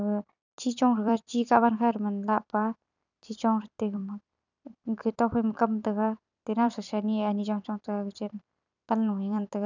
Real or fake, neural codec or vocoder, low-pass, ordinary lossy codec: fake; vocoder, 44.1 kHz, 128 mel bands every 256 samples, BigVGAN v2; 7.2 kHz; none